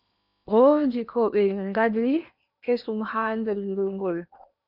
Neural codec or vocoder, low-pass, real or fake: codec, 16 kHz in and 24 kHz out, 0.8 kbps, FocalCodec, streaming, 65536 codes; 5.4 kHz; fake